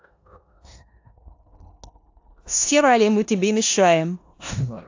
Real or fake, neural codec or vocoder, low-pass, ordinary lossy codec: fake; codec, 16 kHz in and 24 kHz out, 0.9 kbps, LongCat-Audio-Codec, four codebook decoder; 7.2 kHz; AAC, 48 kbps